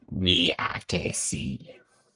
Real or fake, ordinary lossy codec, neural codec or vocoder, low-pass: fake; MP3, 96 kbps; codec, 44.1 kHz, 1.7 kbps, Pupu-Codec; 10.8 kHz